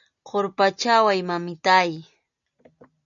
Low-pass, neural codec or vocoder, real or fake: 7.2 kHz; none; real